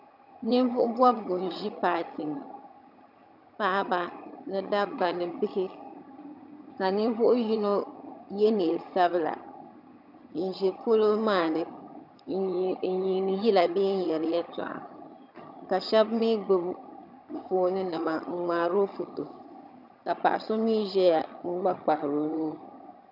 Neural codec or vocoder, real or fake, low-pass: vocoder, 22.05 kHz, 80 mel bands, HiFi-GAN; fake; 5.4 kHz